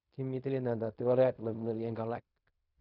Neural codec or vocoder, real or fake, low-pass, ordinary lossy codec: codec, 16 kHz in and 24 kHz out, 0.4 kbps, LongCat-Audio-Codec, fine tuned four codebook decoder; fake; 5.4 kHz; none